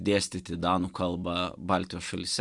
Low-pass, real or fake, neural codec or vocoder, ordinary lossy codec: 10.8 kHz; real; none; Opus, 64 kbps